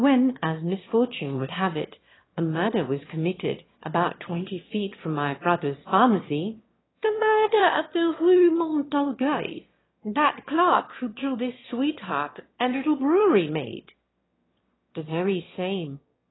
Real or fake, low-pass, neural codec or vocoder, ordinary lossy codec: fake; 7.2 kHz; autoencoder, 22.05 kHz, a latent of 192 numbers a frame, VITS, trained on one speaker; AAC, 16 kbps